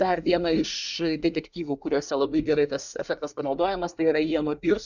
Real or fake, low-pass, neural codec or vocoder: fake; 7.2 kHz; codec, 24 kHz, 1 kbps, SNAC